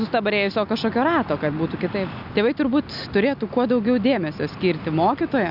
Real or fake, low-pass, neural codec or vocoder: real; 5.4 kHz; none